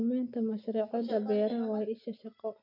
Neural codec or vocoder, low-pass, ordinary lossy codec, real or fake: none; 5.4 kHz; none; real